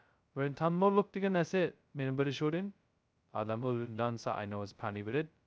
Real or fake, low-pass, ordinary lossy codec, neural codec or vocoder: fake; none; none; codec, 16 kHz, 0.2 kbps, FocalCodec